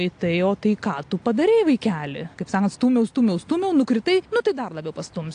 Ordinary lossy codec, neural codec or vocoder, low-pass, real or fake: AAC, 48 kbps; none; 9.9 kHz; real